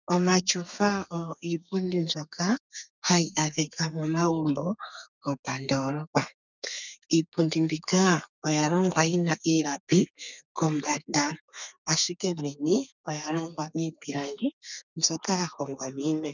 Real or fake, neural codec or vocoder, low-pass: fake; codec, 32 kHz, 1.9 kbps, SNAC; 7.2 kHz